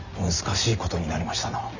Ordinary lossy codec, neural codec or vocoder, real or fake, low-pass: none; none; real; 7.2 kHz